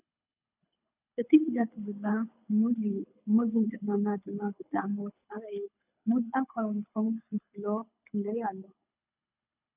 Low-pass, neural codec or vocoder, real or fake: 3.6 kHz; codec, 24 kHz, 6 kbps, HILCodec; fake